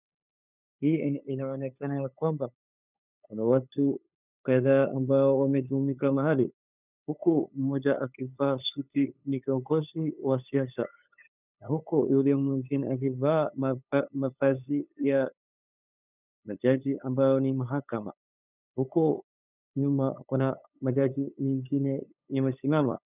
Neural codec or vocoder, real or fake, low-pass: codec, 16 kHz, 8 kbps, FunCodec, trained on LibriTTS, 25 frames a second; fake; 3.6 kHz